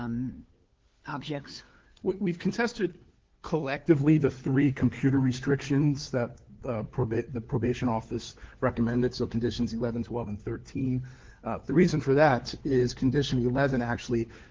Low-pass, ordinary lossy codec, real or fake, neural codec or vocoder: 7.2 kHz; Opus, 16 kbps; fake; codec, 16 kHz, 4 kbps, FunCodec, trained on LibriTTS, 50 frames a second